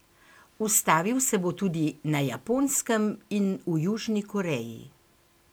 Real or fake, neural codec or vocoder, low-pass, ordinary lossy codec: real; none; none; none